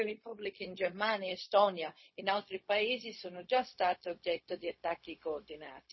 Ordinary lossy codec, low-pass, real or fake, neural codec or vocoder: MP3, 24 kbps; 7.2 kHz; fake; codec, 16 kHz, 0.4 kbps, LongCat-Audio-Codec